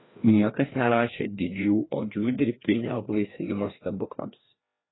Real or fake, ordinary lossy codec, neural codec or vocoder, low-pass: fake; AAC, 16 kbps; codec, 16 kHz, 1 kbps, FreqCodec, larger model; 7.2 kHz